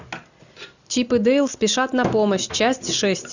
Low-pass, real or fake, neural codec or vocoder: 7.2 kHz; real; none